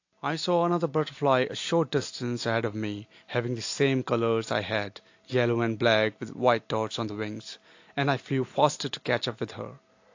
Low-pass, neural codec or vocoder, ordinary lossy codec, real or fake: 7.2 kHz; none; AAC, 48 kbps; real